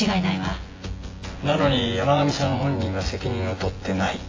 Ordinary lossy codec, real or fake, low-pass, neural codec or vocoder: AAC, 32 kbps; fake; 7.2 kHz; vocoder, 24 kHz, 100 mel bands, Vocos